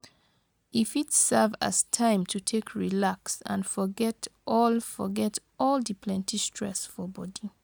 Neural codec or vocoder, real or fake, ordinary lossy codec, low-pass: none; real; none; none